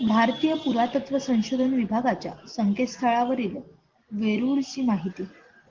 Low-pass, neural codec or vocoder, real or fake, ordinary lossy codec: 7.2 kHz; none; real; Opus, 16 kbps